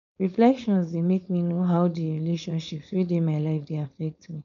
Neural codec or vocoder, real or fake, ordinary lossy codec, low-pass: codec, 16 kHz, 4.8 kbps, FACodec; fake; MP3, 96 kbps; 7.2 kHz